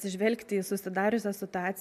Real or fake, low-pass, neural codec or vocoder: real; 14.4 kHz; none